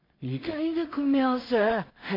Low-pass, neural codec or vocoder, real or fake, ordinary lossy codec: 5.4 kHz; codec, 16 kHz in and 24 kHz out, 0.4 kbps, LongCat-Audio-Codec, two codebook decoder; fake; MP3, 32 kbps